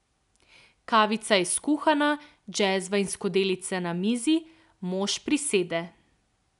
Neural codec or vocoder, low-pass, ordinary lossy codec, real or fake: none; 10.8 kHz; none; real